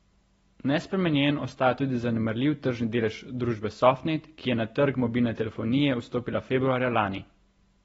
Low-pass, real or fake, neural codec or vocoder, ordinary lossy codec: 19.8 kHz; real; none; AAC, 24 kbps